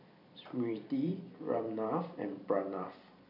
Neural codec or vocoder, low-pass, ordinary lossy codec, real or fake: vocoder, 44.1 kHz, 128 mel bands every 256 samples, BigVGAN v2; 5.4 kHz; none; fake